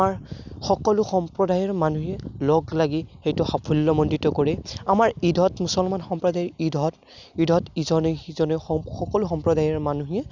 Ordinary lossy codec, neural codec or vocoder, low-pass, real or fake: none; none; 7.2 kHz; real